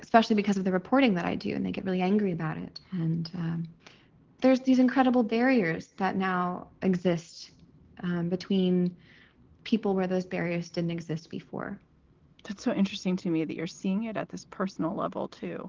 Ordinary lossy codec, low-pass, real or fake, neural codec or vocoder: Opus, 16 kbps; 7.2 kHz; real; none